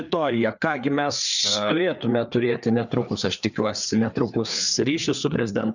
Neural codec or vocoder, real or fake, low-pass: codec, 16 kHz, 4 kbps, FreqCodec, larger model; fake; 7.2 kHz